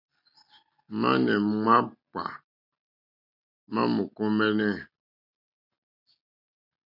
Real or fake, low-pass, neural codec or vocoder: real; 5.4 kHz; none